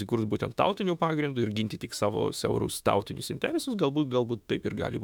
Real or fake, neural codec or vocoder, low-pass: fake; autoencoder, 48 kHz, 32 numbers a frame, DAC-VAE, trained on Japanese speech; 19.8 kHz